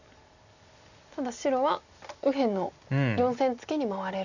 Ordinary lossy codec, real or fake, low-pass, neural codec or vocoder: none; real; 7.2 kHz; none